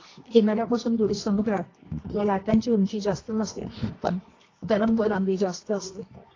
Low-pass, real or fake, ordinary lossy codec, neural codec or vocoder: 7.2 kHz; fake; AAC, 32 kbps; codec, 24 kHz, 0.9 kbps, WavTokenizer, medium music audio release